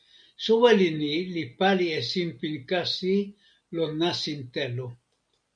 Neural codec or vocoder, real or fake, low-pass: none; real; 9.9 kHz